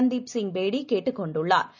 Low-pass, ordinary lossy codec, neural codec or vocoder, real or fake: 7.2 kHz; none; none; real